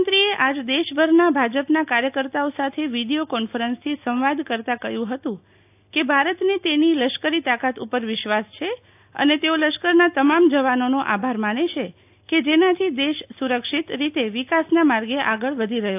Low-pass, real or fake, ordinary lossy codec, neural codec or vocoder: 3.6 kHz; real; AAC, 32 kbps; none